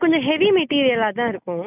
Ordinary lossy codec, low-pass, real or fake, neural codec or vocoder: none; 3.6 kHz; real; none